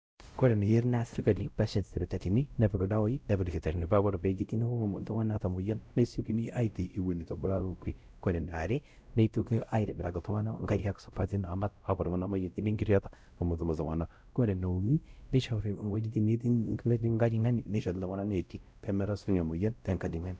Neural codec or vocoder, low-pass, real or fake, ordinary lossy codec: codec, 16 kHz, 0.5 kbps, X-Codec, WavLM features, trained on Multilingual LibriSpeech; none; fake; none